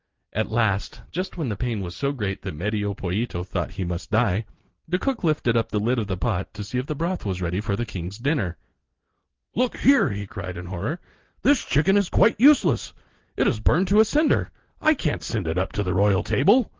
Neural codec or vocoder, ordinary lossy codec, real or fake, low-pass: none; Opus, 16 kbps; real; 7.2 kHz